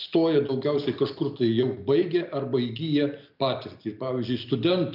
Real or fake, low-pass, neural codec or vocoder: real; 5.4 kHz; none